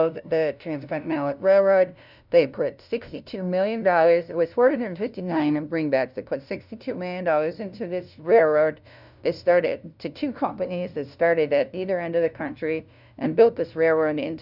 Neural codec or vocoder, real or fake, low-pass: codec, 16 kHz, 0.5 kbps, FunCodec, trained on LibriTTS, 25 frames a second; fake; 5.4 kHz